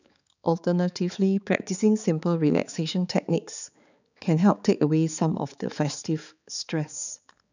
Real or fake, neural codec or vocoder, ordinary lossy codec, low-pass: fake; codec, 16 kHz, 4 kbps, X-Codec, HuBERT features, trained on balanced general audio; none; 7.2 kHz